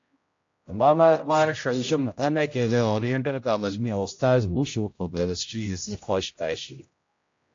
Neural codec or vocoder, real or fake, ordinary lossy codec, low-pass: codec, 16 kHz, 0.5 kbps, X-Codec, HuBERT features, trained on general audio; fake; AAC, 48 kbps; 7.2 kHz